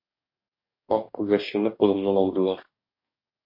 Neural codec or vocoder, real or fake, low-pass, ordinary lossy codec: codec, 44.1 kHz, 2.6 kbps, DAC; fake; 5.4 kHz; MP3, 32 kbps